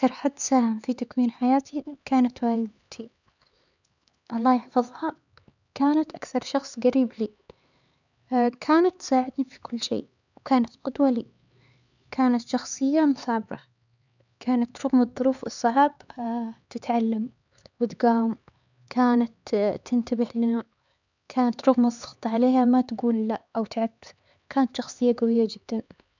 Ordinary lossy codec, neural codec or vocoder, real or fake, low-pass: none; codec, 16 kHz, 4 kbps, X-Codec, HuBERT features, trained on LibriSpeech; fake; 7.2 kHz